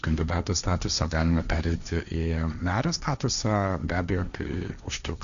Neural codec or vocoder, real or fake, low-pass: codec, 16 kHz, 1.1 kbps, Voila-Tokenizer; fake; 7.2 kHz